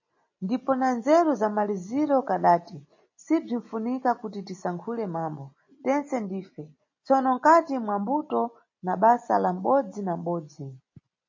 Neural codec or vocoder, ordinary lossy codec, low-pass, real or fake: none; MP3, 32 kbps; 7.2 kHz; real